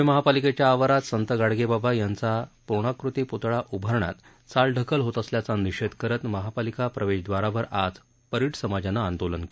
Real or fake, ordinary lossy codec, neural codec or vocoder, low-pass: real; none; none; none